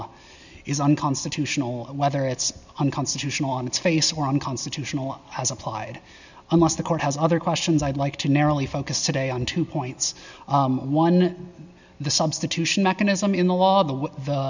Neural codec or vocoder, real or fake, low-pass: none; real; 7.2 kHz